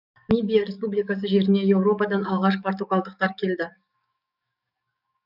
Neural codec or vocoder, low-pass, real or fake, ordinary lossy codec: codec, 44.1 kHz, 7.8 kbps, DAC; 5.4 kHz; fake; none